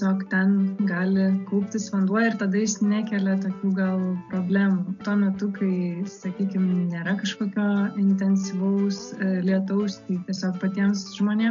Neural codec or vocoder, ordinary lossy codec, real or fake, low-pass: none; AAC, 64 kbps; real; 7.2 kHz